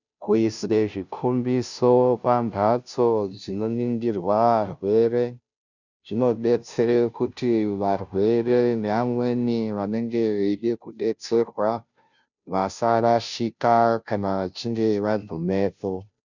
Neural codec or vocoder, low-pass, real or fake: codec, 16 kHz, 0.5 kbps, FunCodec, trained on Chinese and English, 25 frames a second; 7.2 kHz; fake